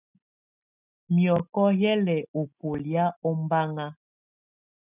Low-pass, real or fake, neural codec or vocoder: 3.6 kHz; real; none